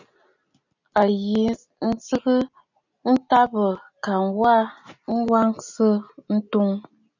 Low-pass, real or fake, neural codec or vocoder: 7.2 kHz; real; none